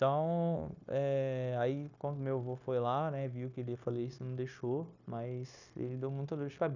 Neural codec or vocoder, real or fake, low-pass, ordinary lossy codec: codec, 16 kHz, 0.9 kbps, LongCat-Audio-Codec; fake; 7.2 kHz; none